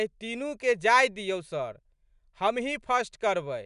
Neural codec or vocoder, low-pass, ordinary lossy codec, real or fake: none; 10.8 kHz; none; real